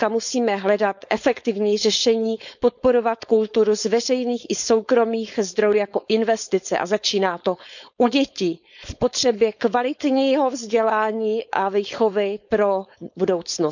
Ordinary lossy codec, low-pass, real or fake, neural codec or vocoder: none; 7.2 kHz; fake; codec, 16 kHz, 4.8 kbps, FACodec